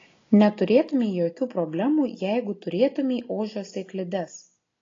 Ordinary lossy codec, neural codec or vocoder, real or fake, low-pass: AAC, 32 kbps; none; real; 7.2 kHz